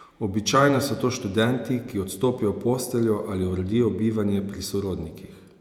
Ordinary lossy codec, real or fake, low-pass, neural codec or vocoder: none; fake; 19.8 kHz; vocoder, 48 kHz, 128 mel bands, Vocos